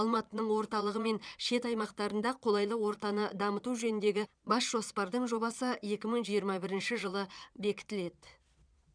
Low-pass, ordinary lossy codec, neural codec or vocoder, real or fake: 9.9 kHz; none; vocoder, 22.05 kHz, 80 mel bands, Vocos; fake